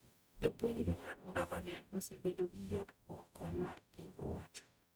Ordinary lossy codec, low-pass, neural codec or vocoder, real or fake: none; none; codec, 44.1 kHz, 0.9 kbps, DAC; fake